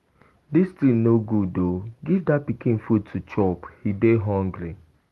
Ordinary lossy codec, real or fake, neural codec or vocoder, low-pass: Opus, 24 kbps; real; none; 14.4 kHz